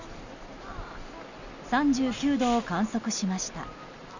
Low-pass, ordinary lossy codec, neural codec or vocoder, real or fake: 7.2 kHz; none; none; real